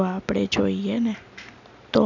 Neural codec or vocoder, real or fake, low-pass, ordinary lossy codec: none; real; 7.2 kHz; none